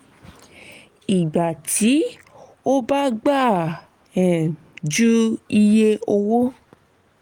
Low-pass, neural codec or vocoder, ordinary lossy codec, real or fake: 19.8 kHz; none; Opus, 24 kbps; real